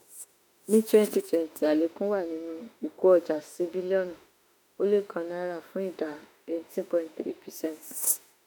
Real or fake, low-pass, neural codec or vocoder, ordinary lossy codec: fake; none; autoencoder, 48 kHz, 32 numbers a frame, DAC-VAE, trained on Japanese speech; none